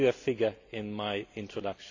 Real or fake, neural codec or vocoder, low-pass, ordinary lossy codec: real; none; 7.2 kHz; AAC, 48 kbps